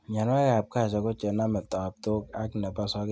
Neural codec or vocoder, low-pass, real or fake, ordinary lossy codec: none; none; real; none